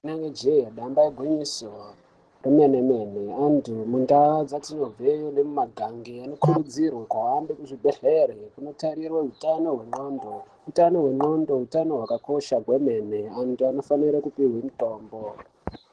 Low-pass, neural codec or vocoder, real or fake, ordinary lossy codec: 10.8 kHz; none; real; Opus, 16 kbps